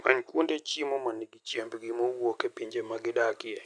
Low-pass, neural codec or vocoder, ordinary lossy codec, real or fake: 9.9 kHz; none; none; real